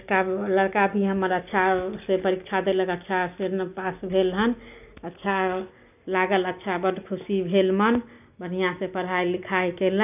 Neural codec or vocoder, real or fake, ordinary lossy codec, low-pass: none; real; none; 3.6 kHz